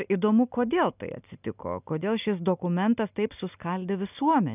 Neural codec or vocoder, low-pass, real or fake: none; 3.6 kHz; real